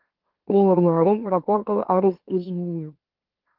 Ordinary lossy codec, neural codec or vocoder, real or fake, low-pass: Opus, 16 kbps; autoencoder, 44.1 kHz, a latent of 192 numbers a frame, MeloTTS; fake; 5.4 kHz